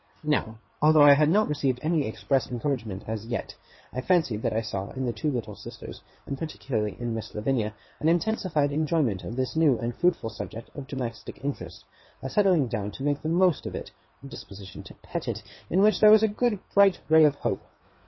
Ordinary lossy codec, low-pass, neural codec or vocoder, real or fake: MP3, 24 kbps; 7.2 kHz; codec, 16 kHz in and 24 kHz out, 2.2 kbps, FireRedTTS-2 codec; fake